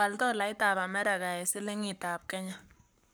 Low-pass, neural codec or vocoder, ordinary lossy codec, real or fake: none; codec, 44.1 kHz, 7.8 kbps, Pupu-Codec; none; fake